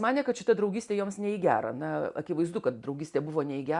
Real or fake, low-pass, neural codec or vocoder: real; 10.8 kHz; none